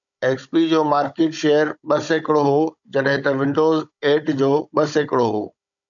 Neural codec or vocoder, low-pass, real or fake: codec, 16 kHz, 16 kbps, FunCodec, trained on Chinese and English, 50 frames a second; 7.2 kHz; fake